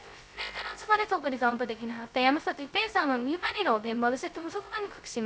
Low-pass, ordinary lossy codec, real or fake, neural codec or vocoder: none; none; fake; codec, 16 kHz, 0.2 kbps, FocalCodec